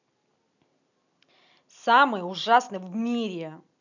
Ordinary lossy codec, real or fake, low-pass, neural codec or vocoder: none; real; 7.2 kHz; none